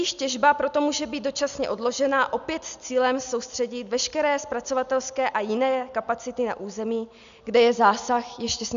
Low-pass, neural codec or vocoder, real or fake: 7.2 kHz; none; real